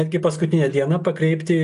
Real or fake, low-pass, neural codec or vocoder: real; 10.8 kHz; none